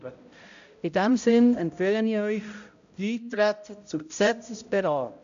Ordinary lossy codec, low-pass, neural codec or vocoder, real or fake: AAC, 64 kbps; 7.2 kHz; codec, 16 kHz, 0.5 kbps, X-Codec, HuBERT features, trained on balanced general audio; fake